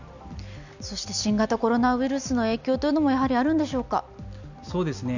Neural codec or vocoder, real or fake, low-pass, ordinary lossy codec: none; real; 7.2 kHz; none